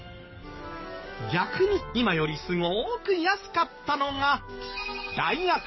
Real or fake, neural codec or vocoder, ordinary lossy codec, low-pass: fake; codec, 44.1 kHz, 7.8 kbps, DAC; MP3, 24 kbps; 7.2 kHz